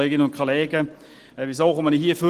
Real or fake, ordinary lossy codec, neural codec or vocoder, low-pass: real; Opus, 16 kbps; none; 14.4 kHz